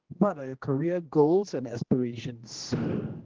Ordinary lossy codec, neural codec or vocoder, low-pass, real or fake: Opus, 16 kbps; codec, 16 kHz, 1 kbps, X-Codec, HuBERT features, trained on general audio; 7.2 kHz; fake